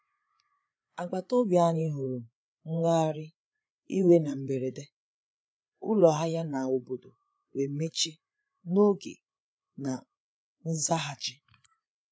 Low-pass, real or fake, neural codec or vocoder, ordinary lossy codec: none; fake; codec, 16 kHz, 8 kbps, FreqCodec, larger model; none